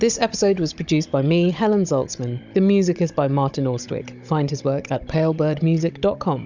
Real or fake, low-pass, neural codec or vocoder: fake; 7.2 kHz; codec, 16 kHz, 16 kbps, FunCodec, trained on Chinese and English, 50 frames a second